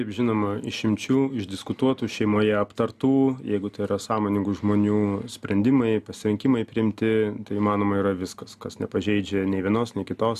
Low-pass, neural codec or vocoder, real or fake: 14.4 kHz; none; real